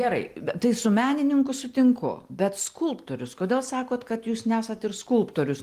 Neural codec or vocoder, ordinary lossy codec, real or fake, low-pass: none; Opus, 24 kbps; real; 14.4 kHz